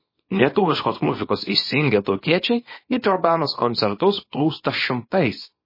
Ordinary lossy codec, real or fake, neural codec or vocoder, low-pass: MP3, 24 kbps; fake; codec, 24 kHz, 0.9 kbps, WavTokenizer, small release; 5.4 kHz